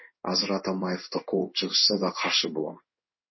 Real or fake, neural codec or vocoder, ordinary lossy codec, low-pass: fake; codec, 16 kHz in and 24 kHz out, 1 kbps, XY-Tokenizer; MP3, 24 kbps; 7.2 kHz